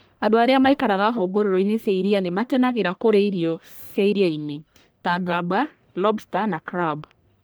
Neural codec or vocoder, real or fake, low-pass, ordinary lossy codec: codec, 44.1 kHz, 1.7 kbps, Pupu-Codec; fake; none; none